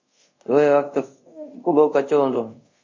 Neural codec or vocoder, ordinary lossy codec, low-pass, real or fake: codec, 24 kHz, 0.5 kbps, DualCodec; MP3, 32 kbps; 7.2 kHz; fake